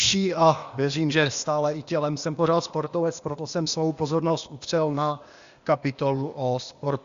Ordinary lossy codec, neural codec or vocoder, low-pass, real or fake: Opus, 64 kbps; codec, 16 kHz, 0.8 kbps, ZipCodec; 7.2 kHz; fake